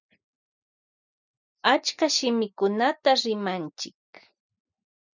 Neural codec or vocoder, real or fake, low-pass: none; real; 7.2 kHz